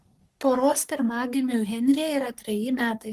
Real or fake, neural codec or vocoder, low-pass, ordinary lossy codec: fake; codec, 44.1 kHz, 3.4 kbps, Pupu-Codec; 14.4 kHz; Opus, 24 kbps